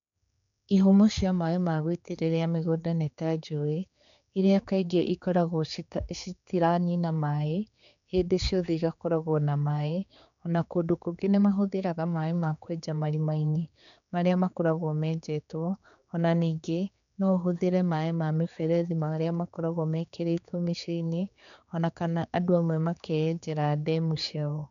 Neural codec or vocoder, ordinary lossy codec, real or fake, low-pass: codec, 16 kHz, 4 kbps, X-Codec, HuBERT features, trained on general audio; none; fake; 7.2 kHz